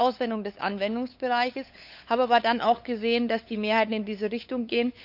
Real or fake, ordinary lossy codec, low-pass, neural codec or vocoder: fake; none; 5.4 kHz; codec, 16 kHz, 8 kbps, FunCodec, trained on LibriTTS, 25 frames a second